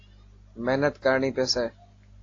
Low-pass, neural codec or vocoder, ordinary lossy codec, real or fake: 7.2 kHz; none; AAC, 32 kbps; real